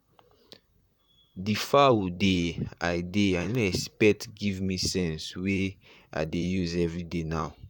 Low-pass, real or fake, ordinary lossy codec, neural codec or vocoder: 19.8 kHz; fake; none; vocoder, 44.1 kHz, 128 mel bands, Pupu-Vocoder